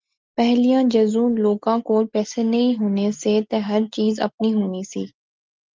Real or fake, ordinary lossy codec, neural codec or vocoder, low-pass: real; Opus, 32 kbps; none; 7.2 kHz